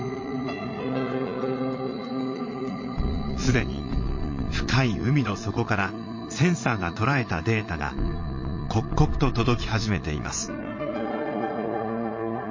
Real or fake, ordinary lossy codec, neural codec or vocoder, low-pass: fake; MP3, 32 kbps; vocoder, 22.05 kHz, 80 mel bands, Vocos; 7.2 kHz